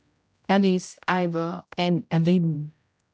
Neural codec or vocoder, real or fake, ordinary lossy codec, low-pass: codec, 16 kHz, 0.5 kbps, X-Codec, HuBERT features, trained on general audio; fake; none; none